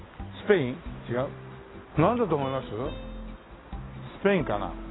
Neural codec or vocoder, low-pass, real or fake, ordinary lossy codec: none; 7.2 kHz; real; AAC, 16 kbps